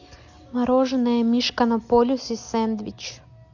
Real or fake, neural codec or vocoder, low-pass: real; none; 7.2 kHz